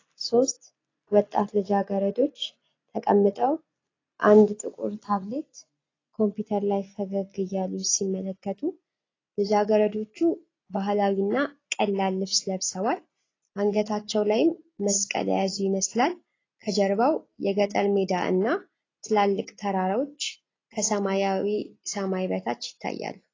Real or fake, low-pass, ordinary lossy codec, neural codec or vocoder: real; 7.2 kHz; AAC, 32 kbps; none